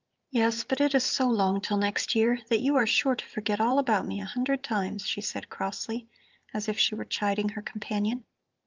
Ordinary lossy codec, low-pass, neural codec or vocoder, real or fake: Opus, 24 kbps; 7.2 kHz; vocoder, 44.1 kHz, 128 mel bands every 512 samples, BigVGAN v2; fake